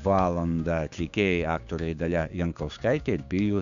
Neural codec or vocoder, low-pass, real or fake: codec, 16 kHz, 6 kbps, DAC; 7.2 kHz; fake